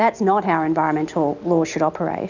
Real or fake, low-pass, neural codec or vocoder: real; 7.2 kHz; none